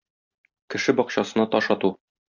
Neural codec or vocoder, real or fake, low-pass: none; real; 7.2 kHz